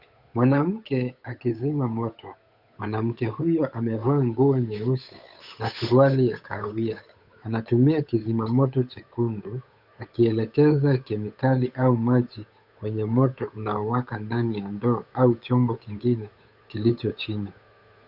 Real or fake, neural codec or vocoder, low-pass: fake; codec, 16 kHz, 8 kbps, FunCodec, trained on Chinese and English, 25 frames a second; 5.4 kHz